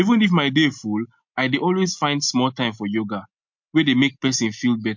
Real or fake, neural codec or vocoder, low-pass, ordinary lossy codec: real; none; 7.2 kHz; MP3, 48 kbps